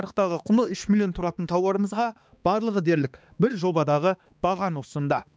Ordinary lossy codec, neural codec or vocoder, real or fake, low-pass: none; codec, 16 kHz, 2 kbps, X-Codec, HuBERT features, trained on balanced general audio; fake; none